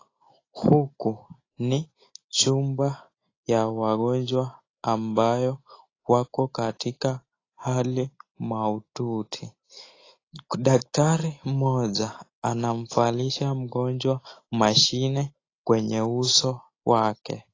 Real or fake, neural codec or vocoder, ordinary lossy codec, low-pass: real; none; AAC, 32 kbps; 7.2 kHz